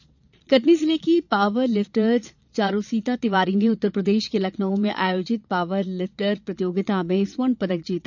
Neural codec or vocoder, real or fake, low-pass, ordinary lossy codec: vocoder, 22.05 kHz, 80 mel bands, Vocos; fake; 7.2 kHz; none